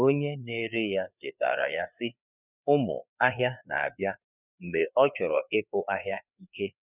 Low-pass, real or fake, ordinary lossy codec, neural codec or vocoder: 3.6 kHz; fake; none; codec, 16 kHz, 4 kbps, FreqCodec, larger model